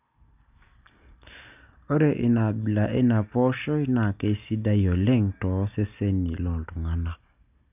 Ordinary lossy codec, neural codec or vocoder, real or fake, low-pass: none; none; real; 3.6 kHz